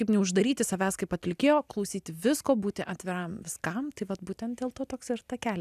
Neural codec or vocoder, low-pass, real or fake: none; 14.4 kHz; real